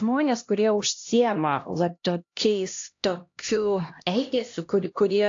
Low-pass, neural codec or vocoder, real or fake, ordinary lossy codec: 7.2 kHz; codec, 16 kHz, 1 kbps, X-Codec, HuBERT features, trained on LibriSpeech; fake; AAC, 48 kbps